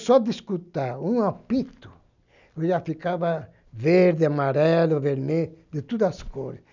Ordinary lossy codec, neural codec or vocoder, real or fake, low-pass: none; none; real; 7.2 kHz